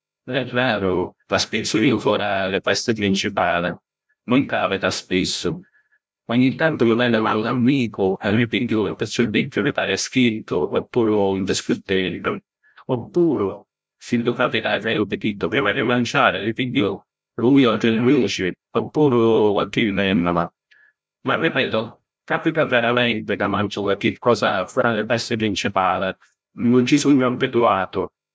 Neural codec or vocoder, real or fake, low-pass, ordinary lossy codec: codec, 16 kHz, 0.5 kbps, FreqCodec, larger model; fake; none; none